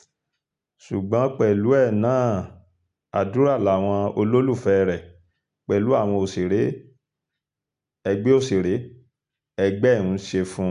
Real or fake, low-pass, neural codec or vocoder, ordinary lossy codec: real; 10.8 kHz; none; none